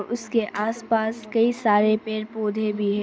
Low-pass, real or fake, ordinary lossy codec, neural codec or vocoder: none; real; none; none